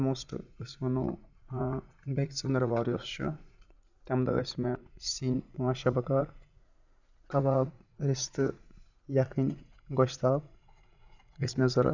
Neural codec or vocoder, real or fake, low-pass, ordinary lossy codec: vocoder, 44.1 kHz, 80 mel bands, Vocos; fake; 7.2 kHz; none